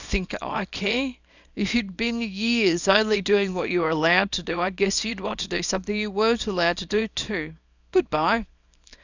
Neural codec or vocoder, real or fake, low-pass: codec, 24 kHz, 0.9 kbps, WavTokenizer, small release; fake; 7.2 kHz